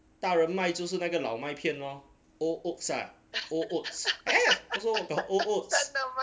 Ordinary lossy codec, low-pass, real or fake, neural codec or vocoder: none; none; real; none